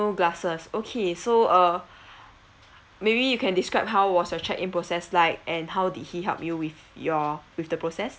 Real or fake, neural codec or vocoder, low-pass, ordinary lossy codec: real; none; none; none